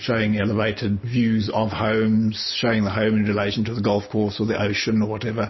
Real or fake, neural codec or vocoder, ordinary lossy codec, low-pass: real; none; MP3, 24 kbps; 7.2 kHz